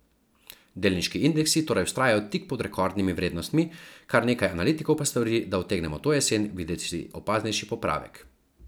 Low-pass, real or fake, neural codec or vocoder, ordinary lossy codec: none; real; none; none